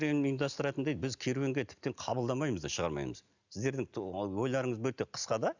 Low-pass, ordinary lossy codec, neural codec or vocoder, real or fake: 7.2 kHz; none; none; real